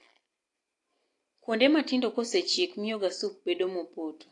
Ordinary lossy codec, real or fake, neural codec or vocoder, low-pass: AAC, 48 kbps; real; none; 10.8 kHz